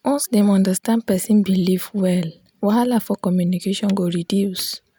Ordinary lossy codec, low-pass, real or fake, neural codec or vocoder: none; none; real; none